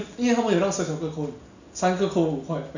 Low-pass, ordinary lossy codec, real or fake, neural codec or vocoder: 7.2 kHz; none; real; none